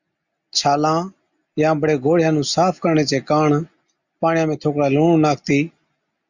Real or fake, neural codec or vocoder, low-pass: real; none; 7.2 kHz